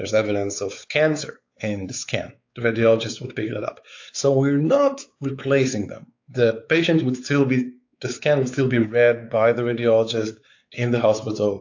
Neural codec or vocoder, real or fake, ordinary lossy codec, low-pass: codec, 16 kHz, 4 kbps, X-Codec, WavLM features, trained on Multilingual LibriSpeech; fake; AAC, 48 kbps; 7.2 kHz